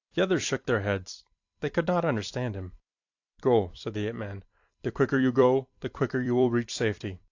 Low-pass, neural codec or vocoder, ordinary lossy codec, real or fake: 7.2 kHz; vocoder, 44.1 kHz, 128 mel bands every 256 samples, BigVGAN v2; AAC, 48 kbps; fake